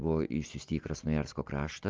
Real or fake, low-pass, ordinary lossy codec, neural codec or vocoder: real; 7.2 kHz; Opus, 32 kbps; none